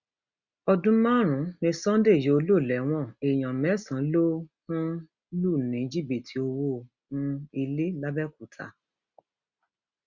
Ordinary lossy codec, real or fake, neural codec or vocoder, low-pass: Opus, 64 kbps; real; none; 7.2 kHz